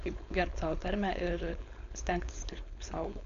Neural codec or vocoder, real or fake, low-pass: codec, 16 kHz, 4.8 kbps, FACodec; fake; 7.2 kHz